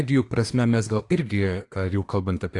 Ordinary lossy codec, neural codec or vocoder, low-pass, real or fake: AAC, 48 kbps; codec, 24 kHz, 1 kbps, SNAC; 10.8 kHz; fake